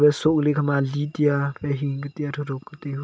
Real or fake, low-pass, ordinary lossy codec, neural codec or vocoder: real; none; none; none